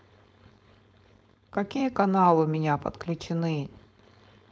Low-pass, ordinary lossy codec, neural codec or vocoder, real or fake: none; none; codec, 16 kHz, 4.8 kbps, FACodec; fake